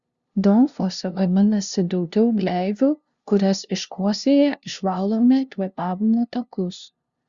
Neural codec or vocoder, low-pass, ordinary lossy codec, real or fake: codec, 16 kHz, 0.5 kbps, FunCodec, trained on LibriTTS, 25 frames a second; 7.2 kHz; Opus, 64 kbps; fake